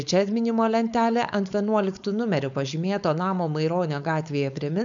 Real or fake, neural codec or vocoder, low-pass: fake; codec, 16 kHz, 4.8 kbps, FACodec; 7.2 kHz